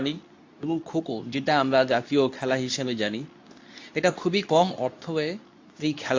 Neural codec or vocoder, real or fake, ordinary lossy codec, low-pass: codec, 24 kHz, 0.9 kbps, WavTokenizer, medium speech release version 2; fake; AAC, 48 kbps; 7.2 kHz